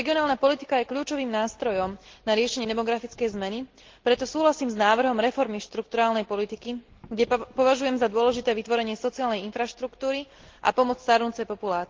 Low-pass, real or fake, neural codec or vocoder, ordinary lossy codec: 7.2 kHz; real; none; Opus, 16 kbps